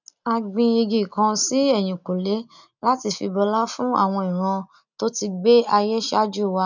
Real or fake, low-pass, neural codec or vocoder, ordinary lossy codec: real; 7.2 kHz; none; none